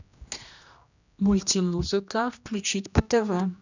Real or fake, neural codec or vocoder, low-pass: fake; codec, 16 kHz, 1 kbps, X-Codec, HuBERT features, trained on general audio; 7.2 kHz